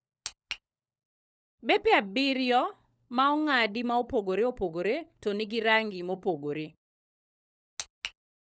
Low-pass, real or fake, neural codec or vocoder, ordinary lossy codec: none; fake; codec, 16 kHz, 16 kbps, FunCodec, trained on LibriTTS, 50 frames a second; none